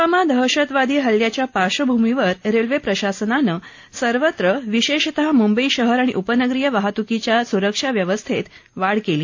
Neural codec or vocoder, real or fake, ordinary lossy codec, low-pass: none; real; AAC, 48 kbps; 7.2 kHz